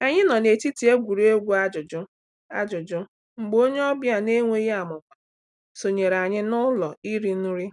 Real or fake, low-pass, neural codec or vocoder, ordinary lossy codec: real; 10.8 kHz; none; none